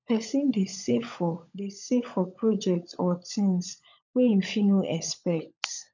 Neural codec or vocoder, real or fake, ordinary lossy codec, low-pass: codec, 16 kHz, 16 kbps, FunCodec, trained on LibriTTS, 50 frames a second; fake; none; 7.2 kHz